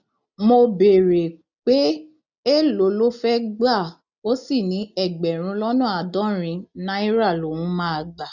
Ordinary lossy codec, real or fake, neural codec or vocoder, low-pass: none; real; none; 7.2 kHz